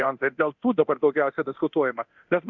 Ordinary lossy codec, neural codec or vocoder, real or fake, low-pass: MP3, 64 kbps; codec, 24 kHz, 0.9 kbps, DualCodec; fake; 7.2 kHz